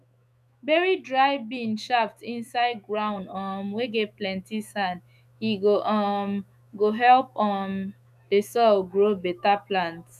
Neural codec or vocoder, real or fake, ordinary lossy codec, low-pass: autoencoder, 48 kHz, 128 numbers a frame, DAC-VAE, trained on Japanese speech; fake; none; 14.4 kHz